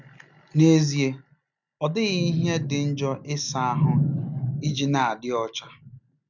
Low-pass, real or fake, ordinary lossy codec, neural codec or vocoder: 7.2 kHz; real; none; none